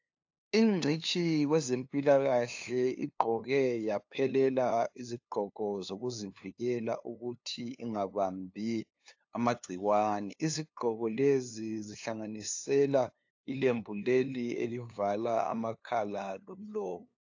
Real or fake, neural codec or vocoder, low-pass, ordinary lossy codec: fake; codec, 16 kHz, 2 kbps, FunCodec, trained on LibriTTS, 25 frames a second; 7.2 kHz; AAC, 48 kbps